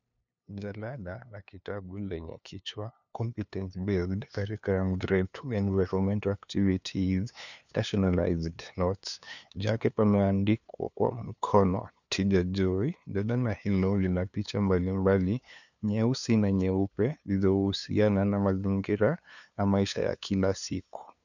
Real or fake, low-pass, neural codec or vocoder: fake; 7.2 kHz; codec, 16 kHz, 2 kbps, FunCodec, trained on LibriTTS, 25 frames a second